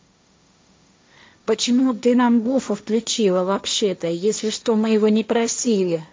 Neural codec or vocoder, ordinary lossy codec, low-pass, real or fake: codec, 16 kHz, 1.1 kbps, Voila-Tokenizer; none; none; fake